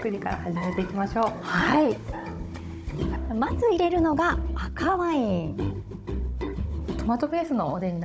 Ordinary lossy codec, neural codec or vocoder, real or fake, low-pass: none; codec, 16 kHz, 16 kbps, FunCodec, trained on Chinese and English, 50 frames a second; fake; none